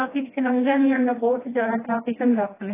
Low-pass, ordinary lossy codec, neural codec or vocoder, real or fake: 3.6 kHz; AAC, 16 kbps; codec, 16 kHz, 1 kbps, FreqCodec, smaller model; fake